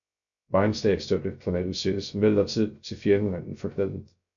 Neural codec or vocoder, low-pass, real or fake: codec, 16 kHz, 0.3 kbps, FocalCodec; 7.2 kHz; fake